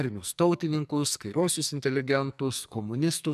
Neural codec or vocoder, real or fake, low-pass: codec, 44.1 kHz, 2.6 kbps, SNAC; fake; 14.4 kHz